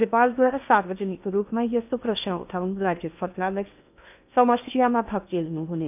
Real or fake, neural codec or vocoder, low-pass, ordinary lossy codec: fake; codec, 16 kHz in and 24 kHz out, 0.8 kbps, FocalCodec, streaming, 65536 codes; 3.6 kHz; none